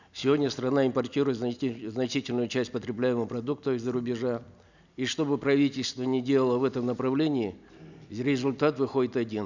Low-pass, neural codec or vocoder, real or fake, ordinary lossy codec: 7.2 kHz; none; real; none